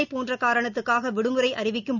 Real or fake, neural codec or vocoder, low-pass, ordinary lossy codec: real; none; 7.2 kHz; none